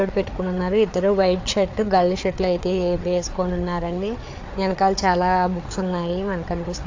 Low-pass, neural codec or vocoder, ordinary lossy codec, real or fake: 7.2 kHz; codec, 16 kHz, 4 kbps, FreqCodec, larger model; AAC, 48 kbps; fake